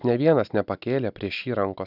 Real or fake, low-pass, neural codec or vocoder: real; 5.4 kHz; none